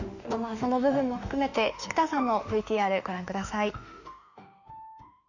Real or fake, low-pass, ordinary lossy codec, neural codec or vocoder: fake; 7.2 kHz; none; autoencoder, 48 kHz, 32 numbers a frame, DAC-VAE, trained on Japanese speech